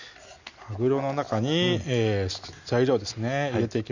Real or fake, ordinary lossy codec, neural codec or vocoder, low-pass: real; Opus, 64 kbps; none; 7.2 kHz